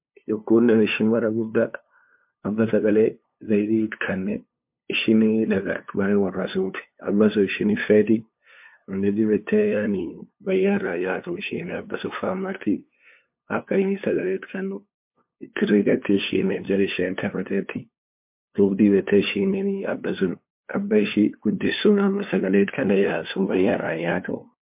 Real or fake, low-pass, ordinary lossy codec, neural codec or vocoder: fake; 3.6 kHz; MP3, 32 kbps; codec, 16 kHz, 2 kbps, FunCodec, trained on LibriTTS, 25 frames a second